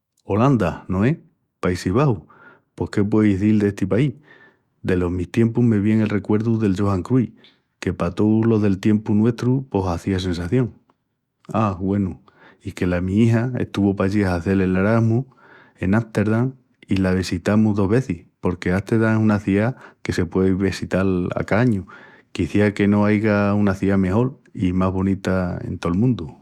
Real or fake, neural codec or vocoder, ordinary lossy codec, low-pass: fake; autoencoder, 48 kHz, 128 numbers a frame, DAC-VAE, trained on Japanese speech; Opus, 64 kbps; 19.8 kHz